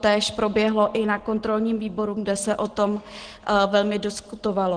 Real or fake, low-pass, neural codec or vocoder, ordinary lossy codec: real; 9.9 kHz; none; Opus, 16 kbps